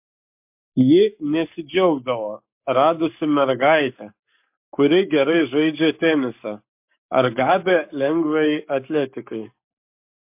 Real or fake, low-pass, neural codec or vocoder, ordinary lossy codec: fake; 3.6 kHz; codec, 44.1 kHz, 7.8 kbps, Pupu-Codec; MP3, 32 kbps